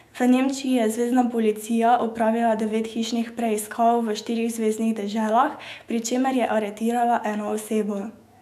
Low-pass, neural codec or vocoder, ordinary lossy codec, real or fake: 14.4 kHz; autoencoder, 48 kHz, 128 numbers a frame, DAC-VAE, trained on Japanese speech; none; fake